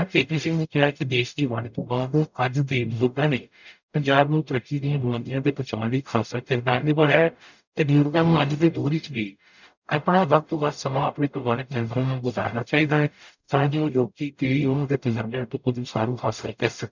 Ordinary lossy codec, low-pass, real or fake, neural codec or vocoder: none; 7.2 kHz; fake; codec, 44.1 kHz, 0.9 kbps, DAC